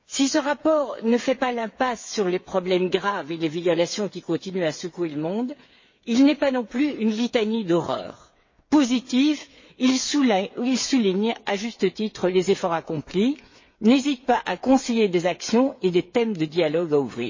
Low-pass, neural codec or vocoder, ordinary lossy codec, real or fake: 7.2 kHz; codec, 16 kHz, 8 kbps, FreqCodec, smaller model; MP3, 32 kbps; fake